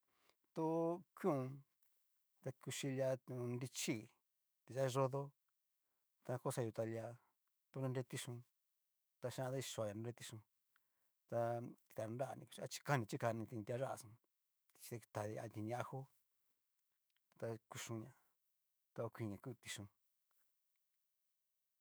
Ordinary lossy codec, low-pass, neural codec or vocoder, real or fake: none; none; autoencoder, 48 kHz, 128 numbers a frame, DAC-VAE, trained on Japanese speech; fake